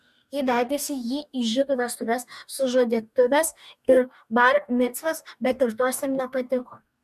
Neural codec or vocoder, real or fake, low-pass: codec, 44.1 kHz, 2.6 kbps, DAC; fake; 14.4 kHz